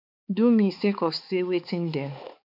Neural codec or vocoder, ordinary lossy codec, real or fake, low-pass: codec, 16 kHz, 4 kbps, X-Codec, HuBERT features, trained on balanced general audio; none; fake; 5.4 kHz